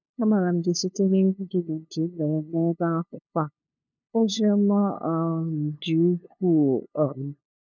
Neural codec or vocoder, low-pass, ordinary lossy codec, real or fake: codec, 16 kHz, 2 kbps, FunCodec, trained on LibriTTS, 25 frames a second; 7.2 kHz; none; fake